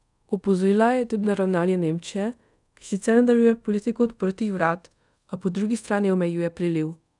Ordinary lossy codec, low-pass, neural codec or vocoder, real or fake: none; 10.8 kHz; codec, 24 kHz, 0.5 kbps, DualCodec; fake